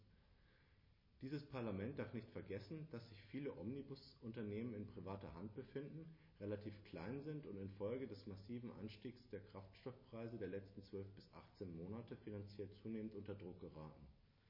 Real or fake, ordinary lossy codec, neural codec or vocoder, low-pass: real; MP3, 24 kbps; none; 5.4 kHz